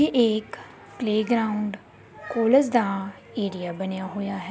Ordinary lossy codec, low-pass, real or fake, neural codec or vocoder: none; none; real; none